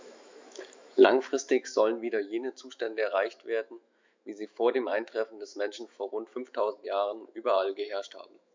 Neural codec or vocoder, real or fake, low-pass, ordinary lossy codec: autoencoder, 48 kHz, 128 numbers a frame, DAC-VAE, trained on Japanese speech; fake; 7.2 kHz; MP3, 64 kbps